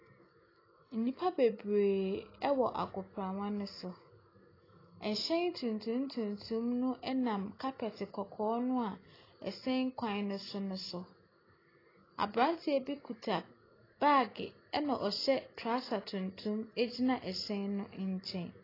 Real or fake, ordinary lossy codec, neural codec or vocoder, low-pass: real; AAC, 24 kbps; none; 5.4 kHz